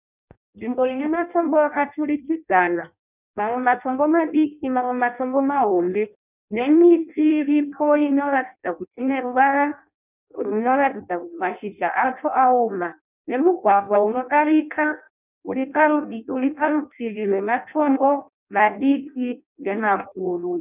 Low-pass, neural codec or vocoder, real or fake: 3.6 kHz; codec, 16 kHz in and 24 kHz out, 0.6 kbps, FireRedTTS-2 codec; fake